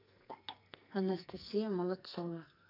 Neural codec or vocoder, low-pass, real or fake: codec, 44.1 kHz, 2.6 kbps, SNAC; 5.4 kHz; fake